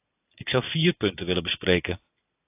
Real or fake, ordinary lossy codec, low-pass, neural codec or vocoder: real; AAC, 32 kbps; 3.6 kHz; none